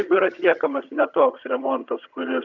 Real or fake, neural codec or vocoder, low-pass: fake; vocoder, 22.05 kHz, 80 mel bands, HiFi-GAN; 7.2 kHz